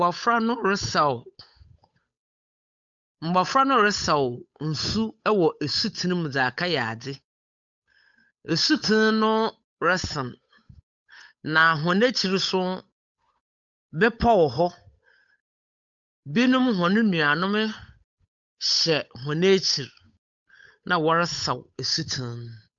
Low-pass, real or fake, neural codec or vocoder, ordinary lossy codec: 7.2 kHz; fake; codec, 16 kHz, 8 kbps, FunCodec, trained on Chinese and English, 25 frames a second; MP3, 64 kbps